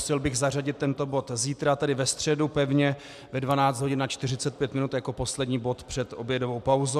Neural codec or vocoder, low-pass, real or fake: none; 14.4 kHz; real